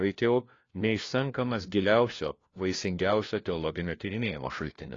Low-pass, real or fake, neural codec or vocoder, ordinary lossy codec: 7.2 kHz; fake; codec, 16 kHz, 1 kbps, FunCodec, trained on LibriTTS, 50 frames a second; AAC, 32 kbps